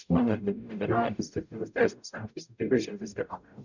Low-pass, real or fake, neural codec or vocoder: 7.2 kHz; fake; codec, 44.1 kHz, 0.9 kbps, DAC